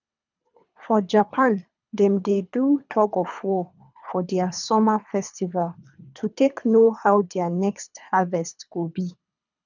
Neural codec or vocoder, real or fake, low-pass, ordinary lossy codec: codec, 24 kHz, 3 kbps, HILCodec; fake; 7.2 kHz; none